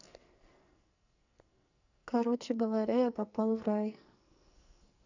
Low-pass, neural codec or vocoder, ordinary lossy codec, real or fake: 7.2 kHz; codec, 44.1 kHz, 2.6 kbps, SNAC; none; fake